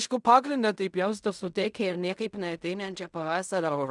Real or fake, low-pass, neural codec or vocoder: fake; 10.8 kHz; codec, 16 kHz in and 24 kHz out, 0.4 kbps, LongCat-Audio-Codec, fine tuned four codebook decoder